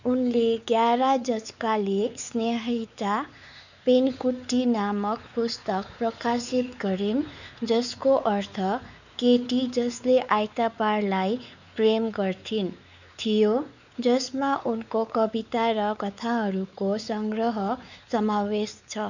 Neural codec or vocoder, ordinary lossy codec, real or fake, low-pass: codec, 16 kHz, 4 kbps, X-Codec, WavLM features, trained on Multilingual LibriSpeech; none; fake; 7.2 kHz